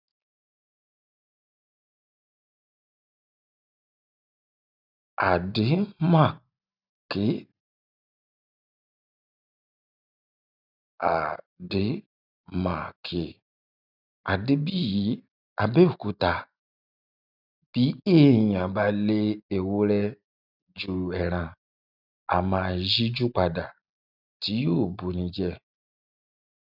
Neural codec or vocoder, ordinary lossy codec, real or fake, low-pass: none; none; real; 5.4 kHz